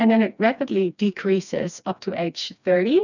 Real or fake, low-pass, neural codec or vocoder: fake; 7.2 kHz; codec, 16 kHz, 2 kbps, FreqCodec, smaller model